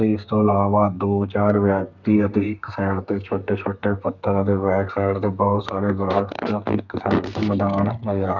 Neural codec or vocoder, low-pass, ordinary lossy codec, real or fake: codec, 32 kHz, 1.9 kbps, SNAC; 7.2 kHz; none; fake